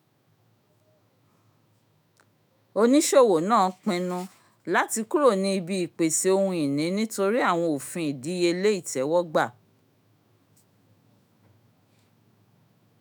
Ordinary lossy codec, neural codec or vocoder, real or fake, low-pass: none; autoencoder, 48 kHz, 128 numbers a frame, DAC-VAE, trained on Japanese speech; fake; none